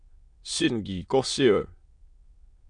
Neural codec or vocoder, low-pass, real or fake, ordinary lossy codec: autoencoder, 22.05 kHz, a latent of 192 numbers a frame, VITS, trained on many speakers; 9.9 kHz; fake; MP3, 64 kbps